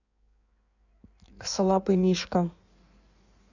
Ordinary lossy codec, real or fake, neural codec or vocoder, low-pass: none; fake; codec, 16 kHz in and 24 kHz out, 1.1 kbps, FireRedTTS-2 codec; 7.2 kHz